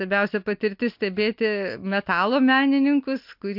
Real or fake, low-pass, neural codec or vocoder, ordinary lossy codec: real; 5.4 kHz; none; MP3, 48 kbps